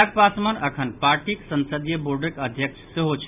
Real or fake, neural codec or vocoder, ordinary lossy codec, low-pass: real; none; none; 3.6 kHz